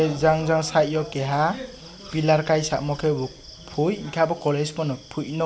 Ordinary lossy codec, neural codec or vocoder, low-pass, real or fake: none; none; none; real